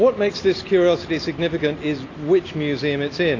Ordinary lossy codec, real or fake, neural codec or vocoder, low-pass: AAC, 32 kbps; real; none; 7.2 kHz